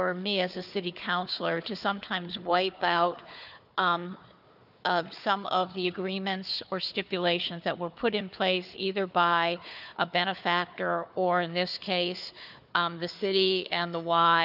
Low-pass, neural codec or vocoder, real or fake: 5.4 kHz; codec, 16 kHz, 4 kbps, FunCodec, trained on Chinese and English, 50 frames a second; fake